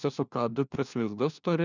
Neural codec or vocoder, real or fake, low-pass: codec, 16 kHz, 1 kbps, FunCodec, trained on LibriTTS, 50 frames a second; fake; 7.2 kHz